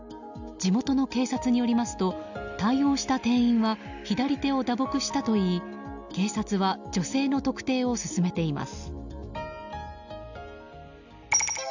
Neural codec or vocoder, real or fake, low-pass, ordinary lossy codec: none; real; 7.2 kHz; none